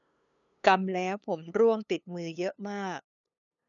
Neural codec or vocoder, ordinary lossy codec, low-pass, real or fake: codec, 16 kHz, 2 kbps, FunCodec, trained on LibriTTS, 25 frames a second; none; 7.2 kHz; fake